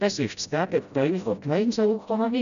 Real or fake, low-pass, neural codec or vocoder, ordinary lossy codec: fake; 7.2 kHz; codec, 16 kHz, 0.5 kbps, FreqCodec, smaller model; none